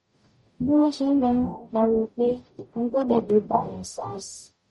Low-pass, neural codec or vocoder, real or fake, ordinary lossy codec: 19.8 kHz; codec, 44.1 kHz, 0.9 kbps, DAC; fake; MP3, 48 kbps